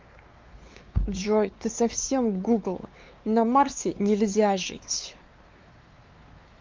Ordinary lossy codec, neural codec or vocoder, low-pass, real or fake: Opus, 32 kbps; codec, 16 kHz, 4 kbps, X-Codec, WavLM features, trained on Multilingual LibriSpeech; 7.2 kHz; fake